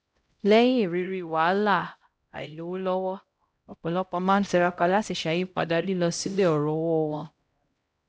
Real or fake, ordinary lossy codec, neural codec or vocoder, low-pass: fake; none; codec, 16 kHz, 0.5 kbps, X-Codec, HuBERT features, trained on LibriSpeech; none